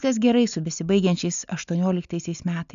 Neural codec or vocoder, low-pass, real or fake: none; 7.2 kHz; real